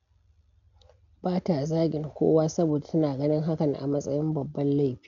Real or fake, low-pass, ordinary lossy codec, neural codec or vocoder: real; 7.2 kHz; none; none